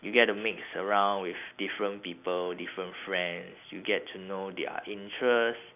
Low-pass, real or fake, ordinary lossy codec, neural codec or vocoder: 3.6 kHz; real; none; none